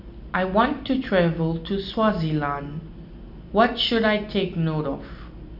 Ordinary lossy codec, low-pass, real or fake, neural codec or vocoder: none; 5.4 kHz; real; none